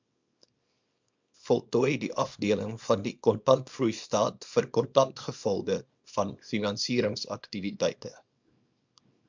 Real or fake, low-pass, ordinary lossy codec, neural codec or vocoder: fake; 7.2 kHz; MP3, 64 kbps; codec, 24 kHz, 0.9 kbps, WavTokenizer, small release